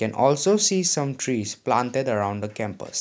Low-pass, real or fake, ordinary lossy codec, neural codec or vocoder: none; real; none; none